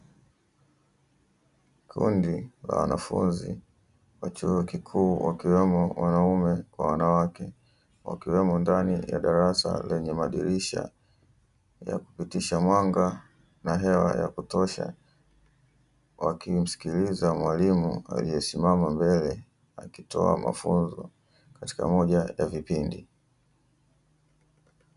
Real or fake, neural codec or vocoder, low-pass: real; none; 10.8 kHz